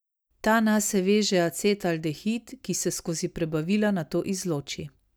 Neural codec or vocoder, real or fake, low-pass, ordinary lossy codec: none; real; none; none